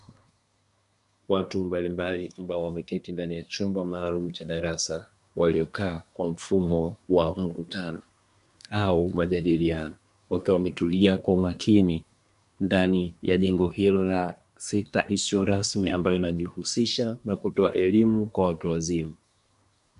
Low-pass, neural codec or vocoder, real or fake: 10.8 kHz; codec, 24 kHz, 1 kbps, SNAC; fake